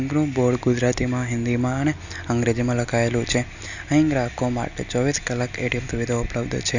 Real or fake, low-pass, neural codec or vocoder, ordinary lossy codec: real; 7.2 kHz; none; none